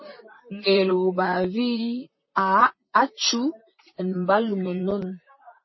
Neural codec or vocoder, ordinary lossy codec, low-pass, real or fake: vocoder, 44.1 kHz, 128 mel bands, Pupu-Vocoder; MP3, 24 kbps; 7.2 kHz; fake